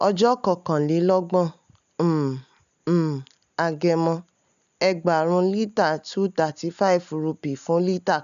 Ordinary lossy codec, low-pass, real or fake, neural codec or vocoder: none; 7.2 kHz; real; none